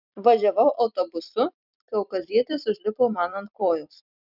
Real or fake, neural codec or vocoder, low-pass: fake; vocoder, 44.1 kHz, 128 mel bands every 256 samples, BigVGAN v2; 5.4 kHz